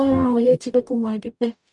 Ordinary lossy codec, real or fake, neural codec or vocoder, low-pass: none; fake; codec, 44.1 kHz, 0.9 kbps, DAC; 10.8 kHz